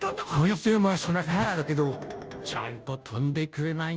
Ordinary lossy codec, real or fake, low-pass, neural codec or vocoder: none; fake; none; codec, 16 kHz, 0.5 kbps, FunCodec, trained on Chinese and English, 25 frames a second